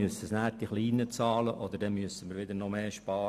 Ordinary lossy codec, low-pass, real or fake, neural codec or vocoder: MP3, 96 kbps; 14.4 kHz; real; none